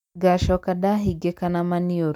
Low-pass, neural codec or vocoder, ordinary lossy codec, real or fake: 19.8 kHz; none; none; real